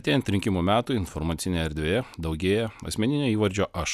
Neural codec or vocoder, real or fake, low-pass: none; real; 14.4 kHz